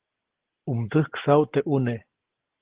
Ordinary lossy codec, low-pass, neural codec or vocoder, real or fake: Opus, 16 kbps; 3.6 kHz; none; real